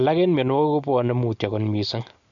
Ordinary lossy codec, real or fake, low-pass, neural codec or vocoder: none; real; 7.2 kHz; none